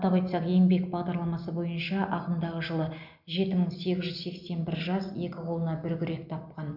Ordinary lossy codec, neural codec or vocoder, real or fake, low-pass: none; none; real; 5.4 kHz